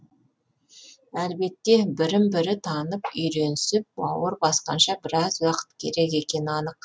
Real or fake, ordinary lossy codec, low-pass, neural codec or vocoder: real; none; none; none